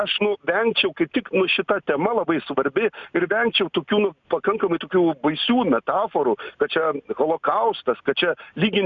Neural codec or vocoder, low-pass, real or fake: none; 7.2 kHz; real